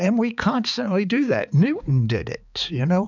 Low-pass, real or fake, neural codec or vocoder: 7.2 kHz; fake; codec, 16 kHz, 4 kbps, X-Codec, HuBERT features, trained on balanced general audio